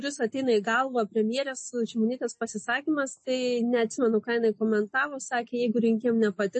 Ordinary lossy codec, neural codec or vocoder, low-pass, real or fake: MP3, 32 kbps; none; 10.8 kHz; real